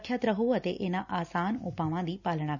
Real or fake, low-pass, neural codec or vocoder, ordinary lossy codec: real; 7.2 kHz; none; none